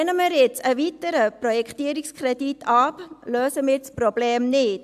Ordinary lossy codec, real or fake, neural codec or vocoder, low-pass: AAC, 96 kbps; real; none; 14.4 kHz